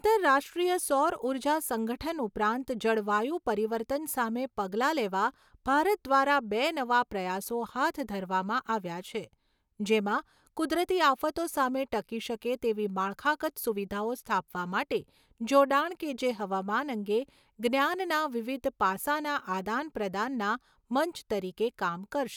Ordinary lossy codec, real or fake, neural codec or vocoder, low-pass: none; real; none; none